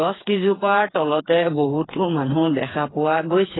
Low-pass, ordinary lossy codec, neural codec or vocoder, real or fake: 7.2 kHz; AAC, 16 kbps; codec, 16 kHz, 4 kbps, FreqCodec, smaller model; fake